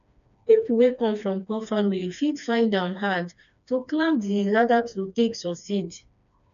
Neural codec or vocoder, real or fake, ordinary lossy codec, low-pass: codec, 16 kHz, 2 kbps, FreqCodec, smaller model; fake; none; 7.2 kHz